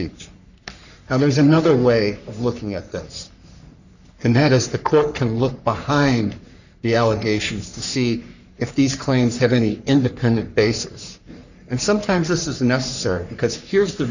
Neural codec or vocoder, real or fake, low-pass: codec, 44.1 kHz, 3.4 kbps, Pupu-Codec; fake; 7.2 kHz